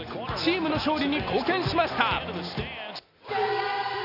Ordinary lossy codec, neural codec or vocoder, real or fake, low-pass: none; none; real; 5.4 kHz